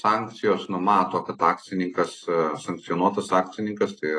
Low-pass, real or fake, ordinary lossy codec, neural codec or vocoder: 9.9 kHz; real; AAC, 32 kbps; none